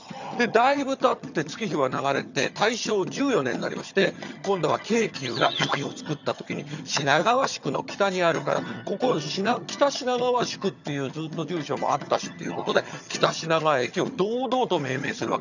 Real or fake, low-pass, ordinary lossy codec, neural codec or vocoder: fake; 7.2 kHz; none; vocoder, 22.05 kHz, 80 mel bands, HiFi-GAN